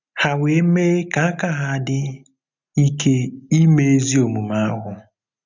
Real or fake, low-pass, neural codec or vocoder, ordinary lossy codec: real; 7.2 kHz; none; none